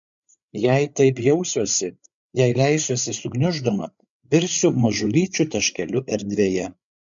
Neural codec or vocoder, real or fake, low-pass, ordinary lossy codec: codec, 16 kHz, 8 kbps, FreqCodec, larger model; fake; 7.2 kHz; MP3, 64 kbps